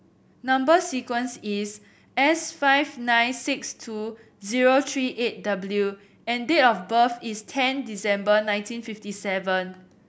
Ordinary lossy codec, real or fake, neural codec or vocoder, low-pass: none; real; none; none